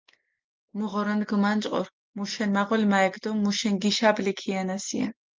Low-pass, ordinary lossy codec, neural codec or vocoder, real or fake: 7.2 kHz; Opus, 32 kbps; none; real